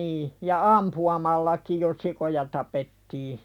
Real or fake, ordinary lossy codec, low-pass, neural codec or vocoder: real; none; 19.8 kHz; none